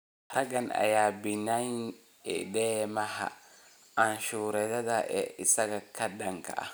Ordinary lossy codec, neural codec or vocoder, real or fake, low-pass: none; none; real; none